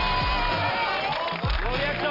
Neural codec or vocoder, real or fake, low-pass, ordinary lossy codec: none; real; 5.4 kHz; none